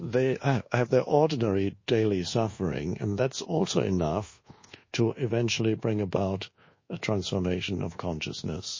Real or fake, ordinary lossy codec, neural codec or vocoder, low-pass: fake; MP3, 32 kbps; codec, 24 kHz, 1.2 kbps, DualCodec; 7.2 kHz